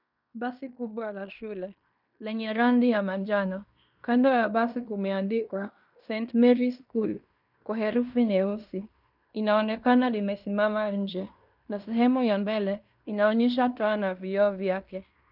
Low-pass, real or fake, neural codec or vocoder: 5.4 kHz; fake; codec, 16 kHz in and 24 kHz out, 0.9 kbps, LongCat-Audio-Codec, fine tuned four codebook decoder